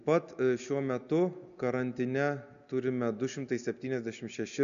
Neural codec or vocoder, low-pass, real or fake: none; 7.2 kHz; real